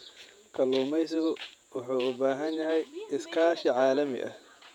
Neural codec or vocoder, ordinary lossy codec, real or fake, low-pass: vocoder, 48 kHz, 128 mel bands, Vocos; none; fake; 19.8 kHz